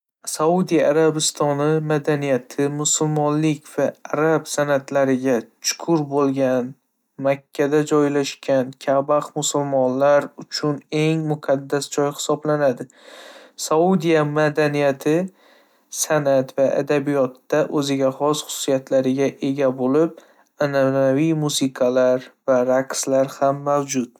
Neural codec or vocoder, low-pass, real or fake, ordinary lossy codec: none; 19.8 kHz; real; none